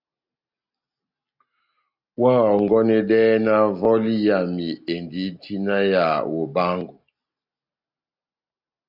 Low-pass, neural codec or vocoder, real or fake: 5.4 kHz; none; real